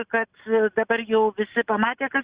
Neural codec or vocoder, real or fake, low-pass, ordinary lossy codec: none; real; 3.6 kHz; Opus, 64 kbps